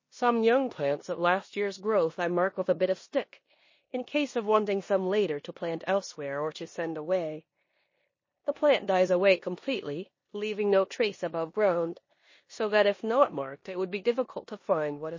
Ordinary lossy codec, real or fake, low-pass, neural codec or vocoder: MP3, 32 kbps; fake; 7.2 kHz; codec, 16 kHz in and 24 kHz out, 0.9 kbps, LongCat-Audio-Codec, four codebook decoder